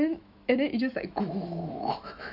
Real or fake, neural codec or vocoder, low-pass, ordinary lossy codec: fake; autoencoder, 48 kHz, 128 numbers a frame, DAC-VAE, trained on Japanese speech; 5.4 kHz; none